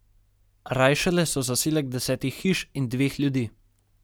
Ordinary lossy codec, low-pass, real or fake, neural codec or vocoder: none; none; real; none